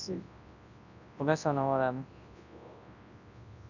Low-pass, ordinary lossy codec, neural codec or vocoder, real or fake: 7.2 kHz; none; codec, 24 kHz, 0.9 kbps, WavTokenizer, large speech release; fake